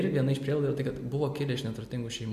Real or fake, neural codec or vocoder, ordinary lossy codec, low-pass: real; none; MP3, 64 kbps; 14.4 kHz